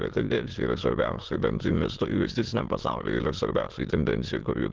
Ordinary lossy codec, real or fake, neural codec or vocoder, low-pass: Opus, 16 kbps; fake; autoencoder, 22.05 kHz, a latent of 192 numbers a frame, VITS, trained on many speakers; 7.2 kHz